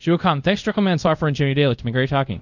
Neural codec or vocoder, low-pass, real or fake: codec, 24 kHz, 0.5 kbps, DualCodec; 7.2 kHz; fake